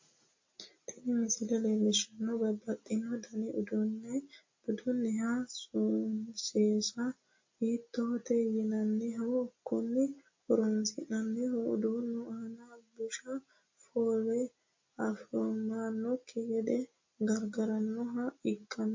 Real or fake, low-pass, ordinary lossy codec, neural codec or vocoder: real; 7.2 kHz; MP3, 32 kbps; none